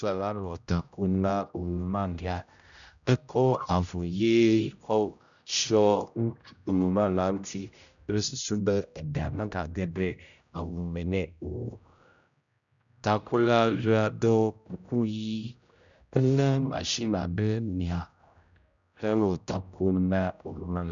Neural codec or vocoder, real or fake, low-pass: codec, 16 kHz, 0.5 kbps, X-Codec, HuBERT features, trained on general audio; fake; 7.2 kHz